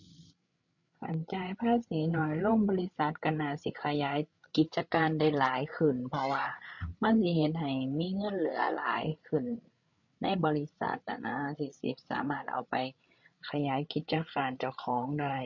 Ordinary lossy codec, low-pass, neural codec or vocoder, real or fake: none; 7.2 kHz; codec, 16 kHz, 8 kbps, FreqCodec, larger model; fake